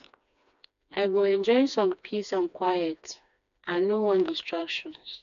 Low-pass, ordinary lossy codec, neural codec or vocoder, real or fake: 7.2 kHz; MP3, 96 kbps; codec, 16 kHz, 2 kbps, FreqCodec, smaller model; fake